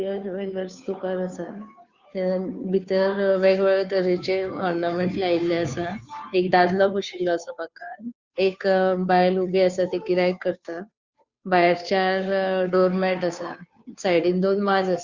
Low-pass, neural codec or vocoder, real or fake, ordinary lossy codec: 7.2 kHz; codec, 16 kHz, 2 kbps, FunCodec, trained on Chinese and English, 25 frames a second; fake; Opus, 64 kbps